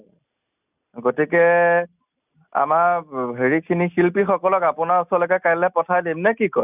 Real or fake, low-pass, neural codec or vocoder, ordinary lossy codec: real; 3.6 kHz; none; none